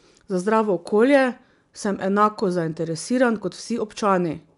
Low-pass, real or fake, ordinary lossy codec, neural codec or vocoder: 10.8 kHz; real; none; none